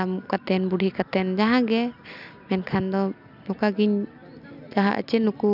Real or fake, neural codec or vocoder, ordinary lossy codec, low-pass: real; none; none; 5.4 kHz